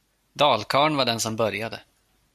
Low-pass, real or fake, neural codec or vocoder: 14.4 kHz; real; none